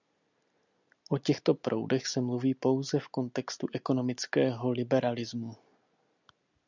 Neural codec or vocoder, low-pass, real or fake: none; 7.2 kHz; real